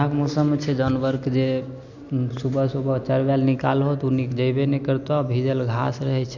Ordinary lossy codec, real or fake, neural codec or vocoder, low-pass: none; real; none; 7.2 kHz